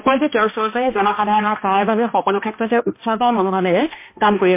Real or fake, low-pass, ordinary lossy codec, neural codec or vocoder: fake; 3.6 kHz; MP3, 24 kbps; codec, 16 kHz, 1 kbps, X-Codec, HuBERT features, trained on balanced general audio